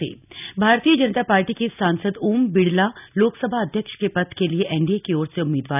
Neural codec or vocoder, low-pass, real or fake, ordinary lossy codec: none; 3.6 kHz; real; none